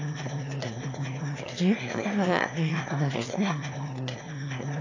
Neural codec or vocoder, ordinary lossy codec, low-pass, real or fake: autoencoder, 22.05 kHz, a latent of 192 numbers a frame, VITS, trained on one speaker; AAC, 48 kbps; 7.2 kHz; fake